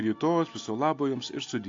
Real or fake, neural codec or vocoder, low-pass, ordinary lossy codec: real; none; 7.2 kHz; AAC, 48 kbps